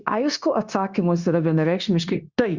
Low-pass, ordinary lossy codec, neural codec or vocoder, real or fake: 7.2 kHz; Opus, 64 kbps; codec, 16 kHz, 0.9 kbps, LongCat-Audio-Codec; fake